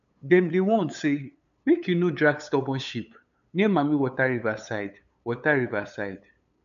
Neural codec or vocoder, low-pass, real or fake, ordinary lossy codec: codec, 16 kHz, 8 kbps, FunCodec, trained on LibriTTS, 25 frames a second; 7.2 kHz; fake; none